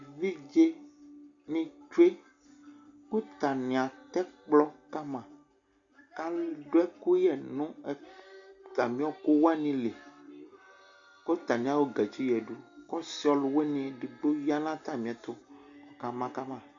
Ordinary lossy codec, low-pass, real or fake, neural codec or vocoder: Opus, 64 kbps; 7.2 kHz; real; none